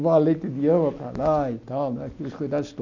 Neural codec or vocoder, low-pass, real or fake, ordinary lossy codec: none; 7.2 kHz; real; none